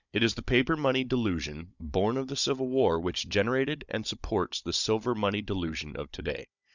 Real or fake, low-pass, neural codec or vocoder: fake; 7.2 kHz; codec, 16 kHz, 16 kbps, FunCodec, trained on Chinese and English, 50 frames a second